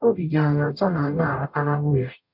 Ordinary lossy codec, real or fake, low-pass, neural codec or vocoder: none; fake; 5.4 kHz; codec, 44.1 kHz, 0.9 kbps, DAC